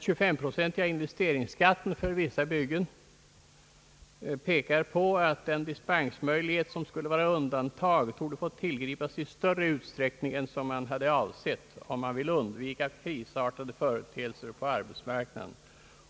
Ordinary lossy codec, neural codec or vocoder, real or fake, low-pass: none; none; real; none